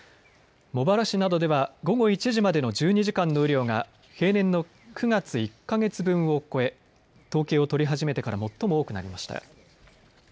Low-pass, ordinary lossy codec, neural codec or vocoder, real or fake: none; none; none; real